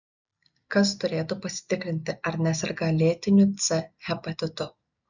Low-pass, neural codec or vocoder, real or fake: 7.2 kHz; none; real